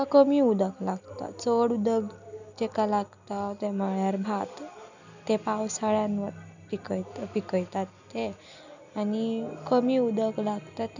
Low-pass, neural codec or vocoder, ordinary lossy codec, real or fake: 7.2 kHz; none; none; real